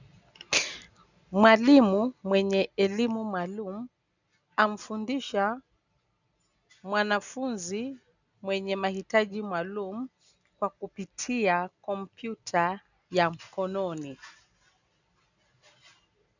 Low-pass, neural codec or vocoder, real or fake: 7.2 kHz; none; real